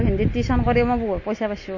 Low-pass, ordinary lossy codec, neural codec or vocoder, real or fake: 7.2 kHz; MP3, 32 kbps; none; real